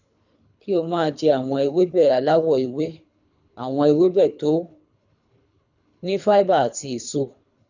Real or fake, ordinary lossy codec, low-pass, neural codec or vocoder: fake; none; 7.2 kHz; codec, 24 kHz, 3 kbps, HILCodec